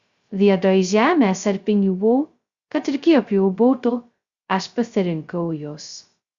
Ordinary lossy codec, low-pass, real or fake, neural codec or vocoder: Opus, 64 kbps; 7.2 kHz; fake; codec, 16 kHz, 0.2 kbps, FocalCodec